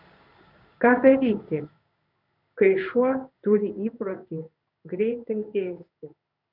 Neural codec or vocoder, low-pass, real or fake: codec, 16 kHz in and 24 kHz out, 1 kbps, XY-Tokenizer; 5.4 kHz; fake